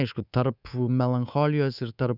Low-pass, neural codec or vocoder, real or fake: 5.4 kHz; codec, 24 kHz, 3.1 kbps, DualCodec; fake